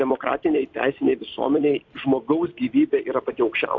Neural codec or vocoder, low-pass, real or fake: vocoder, 44.1 kHz, 128 mel bands every 256 samples, BigVGAN v2; 7.2 kHz; fake